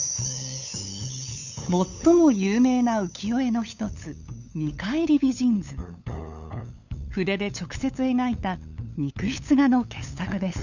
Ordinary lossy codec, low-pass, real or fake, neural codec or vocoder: none; 7.2 kHz; fake; codec, 16 kHz, 8 kbps, FunCodec, trained on LibriTTS, 25 frames a second